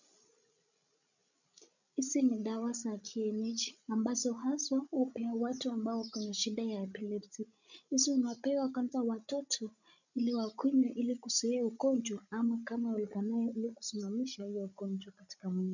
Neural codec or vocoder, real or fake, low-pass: codec, 16 kHz, 16 kbps, FreqCodec, larger model; fake; 7.2 kHz